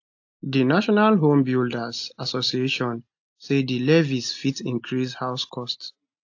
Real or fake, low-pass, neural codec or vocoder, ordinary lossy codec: real; 7.2 kHz; none; AAC, 48 kbps